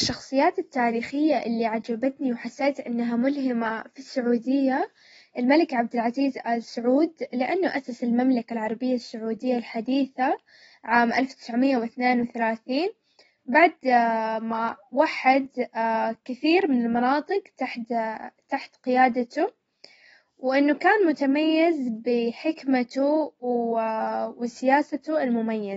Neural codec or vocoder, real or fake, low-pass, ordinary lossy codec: none; real; 19.8 kHz; AAC, 24 kbps